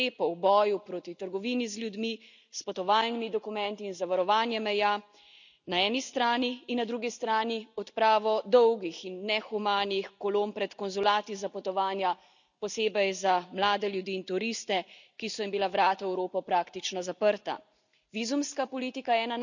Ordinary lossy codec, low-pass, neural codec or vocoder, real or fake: none; 7.2 kHz; none; real